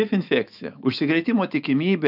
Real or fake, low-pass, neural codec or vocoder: fake; 5.4 kHz; autoencoder, 48 kHz, 128 numbers a frame, DAC-VAE, trained on Japanese speech